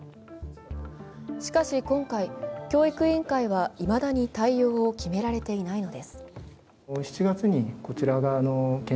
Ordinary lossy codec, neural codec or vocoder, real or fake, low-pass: none; none; real; none